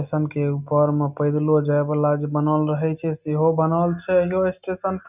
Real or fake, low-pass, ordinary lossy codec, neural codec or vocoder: real; 3.6 kHz; none; none